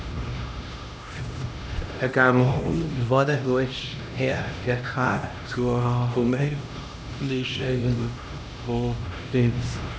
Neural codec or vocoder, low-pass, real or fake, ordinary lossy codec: codec, 16 kHz, 1 kbps, X-Codec, HuBERT features, trained on LibriSpeech; none; fake; none